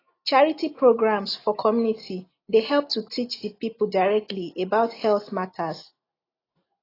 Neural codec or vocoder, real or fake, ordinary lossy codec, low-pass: none; real; AAC, 24 kbps; 5.4 kHz